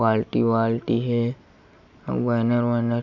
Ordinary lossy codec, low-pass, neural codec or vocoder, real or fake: none; 7.2 kHz; none; real